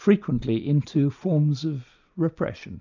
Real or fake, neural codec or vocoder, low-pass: real; none; 7.2 kHz